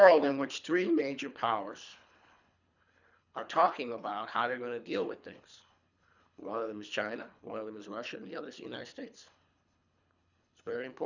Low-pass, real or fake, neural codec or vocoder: 7.2 kHz; fake; codec, 24 kHz, 3 kbps, HILCodec